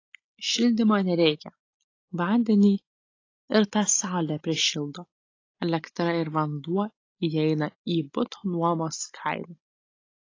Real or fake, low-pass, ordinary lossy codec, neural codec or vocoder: real; 7.2 kHz; AAC, 32 kbps; none